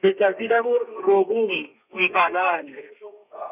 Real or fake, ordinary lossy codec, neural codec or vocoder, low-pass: fake; none; codec, 32 kHz, 1.9 kbps, SNAC; 3.6 kHz